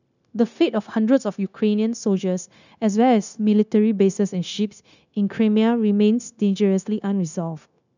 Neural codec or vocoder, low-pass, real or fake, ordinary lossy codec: codec, 16 kHz, 0.9 kbps, LongCat-Audio-Codec; 7.2 kHz; fake; none